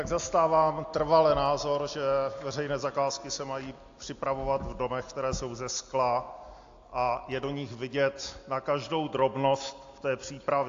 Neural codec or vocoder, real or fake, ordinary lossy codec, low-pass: none; real; AAC, 48 kbps; 7.2 kHz